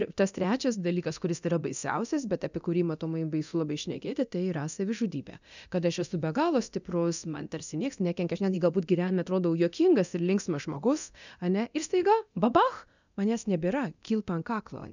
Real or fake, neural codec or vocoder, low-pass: fake; codec, 24 kHz, 0.9 kbps, DualCodec; 7.2 kHz